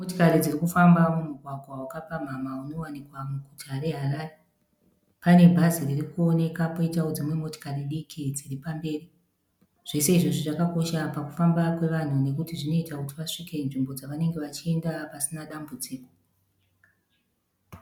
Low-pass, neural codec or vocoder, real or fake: 19.8 kHz; none; real